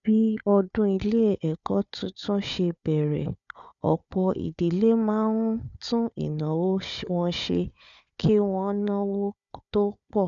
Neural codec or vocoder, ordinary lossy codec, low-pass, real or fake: codec, 16 kHz, 4 kbps, FunCodec, trained on Chinese and English, 50 frames a second; none; 7.2 kHz; fake